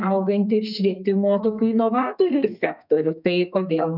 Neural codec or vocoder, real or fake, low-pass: codec, 32 kHz, 1.9 kbps, SNAC; fake; 5.4 kHz